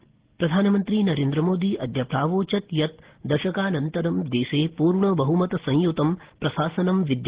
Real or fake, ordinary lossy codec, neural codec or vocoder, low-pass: real; Opus, 16 kbps; none; 3.6 kHz